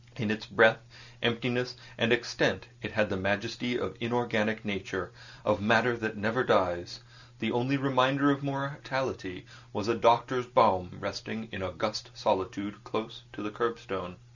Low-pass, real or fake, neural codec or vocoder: 7.2 kHz; real; none